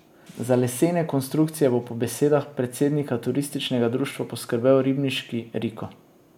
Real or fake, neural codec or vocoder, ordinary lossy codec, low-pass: real; none; MP3, 96 kbps; 19.8 kHz